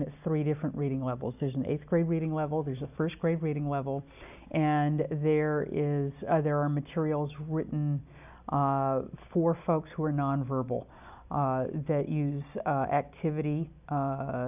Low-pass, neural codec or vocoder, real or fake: 3.6 kHz; none; real